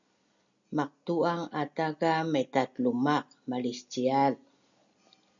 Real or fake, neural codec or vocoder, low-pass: real; none; 7.2 kHz